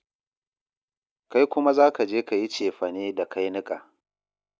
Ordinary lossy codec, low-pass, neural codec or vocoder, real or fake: none; none; none; real